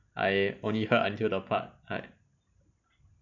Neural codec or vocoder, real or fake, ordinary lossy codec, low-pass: none; real; none; 7.2 kHz